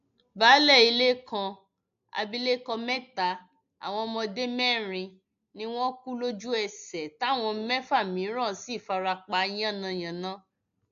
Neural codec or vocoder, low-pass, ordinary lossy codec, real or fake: none; 7.2 kHz; AAC, 64 kbps; real